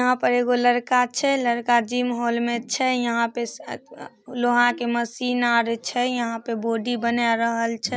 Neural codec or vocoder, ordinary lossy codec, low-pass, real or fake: none; none; none; real